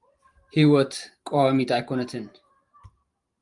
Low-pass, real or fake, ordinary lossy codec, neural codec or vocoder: 10.8 kHz; fake; Opus, 32 kbps; vocoder, 44.1 kHz, 128 mel bands every 512 samples, BigVGAN v2